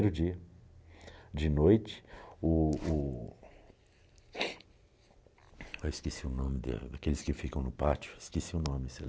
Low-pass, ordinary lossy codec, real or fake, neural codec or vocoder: none; none; real; none